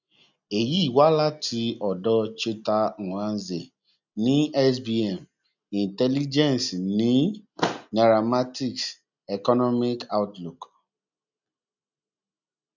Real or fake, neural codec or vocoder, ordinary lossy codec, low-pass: real; none; none; 7.2 kHz